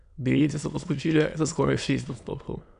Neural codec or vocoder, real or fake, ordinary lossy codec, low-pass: autoencoder, 22.05 kHz, a latent of 192 numbers a frame, VITS, trained on many speakers; fake; none; 9.9 kHz